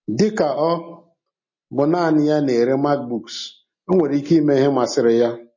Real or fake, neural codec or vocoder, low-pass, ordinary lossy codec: real; none; 7.2 kHz; MP3, 32 kbps